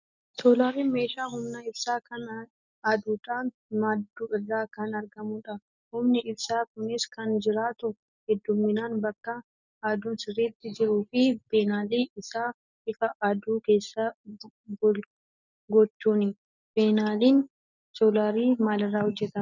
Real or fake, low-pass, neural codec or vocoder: real; 7.2 kHz; none